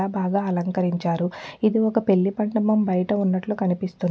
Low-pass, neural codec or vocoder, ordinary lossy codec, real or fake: none; none; none; real